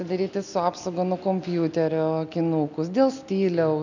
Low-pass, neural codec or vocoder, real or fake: 7.2 kHz; none; real